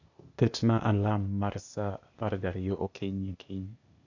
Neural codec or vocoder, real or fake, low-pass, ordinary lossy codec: codec, 16 kHz, 0.8 kbps, ZipCodec; fake; 7.2 kHz; none